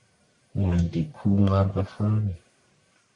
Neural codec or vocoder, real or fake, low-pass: codec, 44.1 kHz, 1.7 kbps, Pupu-Codec; fake; 10.8 kHz